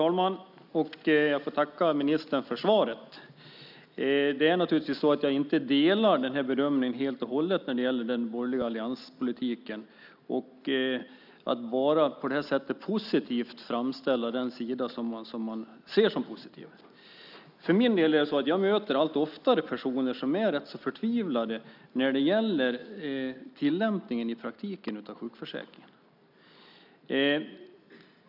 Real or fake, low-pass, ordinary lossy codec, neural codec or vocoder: real; 5.4 kHz; none; none